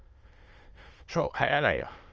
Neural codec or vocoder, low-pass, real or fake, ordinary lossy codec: autoencoder, 22.05 kHz, a latent of 192 numbers a frame, VITS, trained on many speakers; 7.2 kHz; fake; Opus, 24 kbps